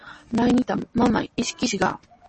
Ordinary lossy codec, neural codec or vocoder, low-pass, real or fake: MP3, 32 kbps; none; 10.8 kHz; real